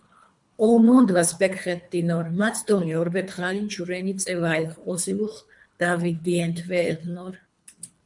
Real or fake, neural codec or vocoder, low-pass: fake; codec, 24 kHz, 3 kbps, HILCodec; 10.8 kHz